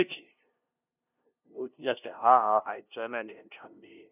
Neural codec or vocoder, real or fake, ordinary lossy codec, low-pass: codec, 16 kHz, 0.5 kbps, FunCodec, trained on LibriTTS, 25 frames a second; fake; none; 3.6 kHz